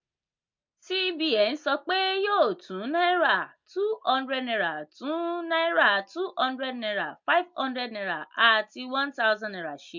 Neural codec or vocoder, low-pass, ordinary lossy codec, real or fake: none; 7.2 kHz; MP3, 48 kbps; real